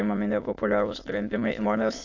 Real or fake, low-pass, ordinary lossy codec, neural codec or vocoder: fake; 7.2 kHz; AAC, 48 kbps; autoencoder, 22.05 kHz, a latent of 192 numbers a frame, VITS, trained on many speakers